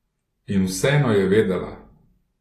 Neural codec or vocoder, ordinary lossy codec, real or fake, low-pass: none; AAC, 48 kbps; real; 14.4 kHz